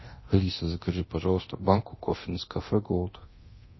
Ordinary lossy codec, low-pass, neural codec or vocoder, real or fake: MP3, 24 kbps; 7.2 kHz; codec, 24 kHz, 0.9 kbps, DualCodec; fake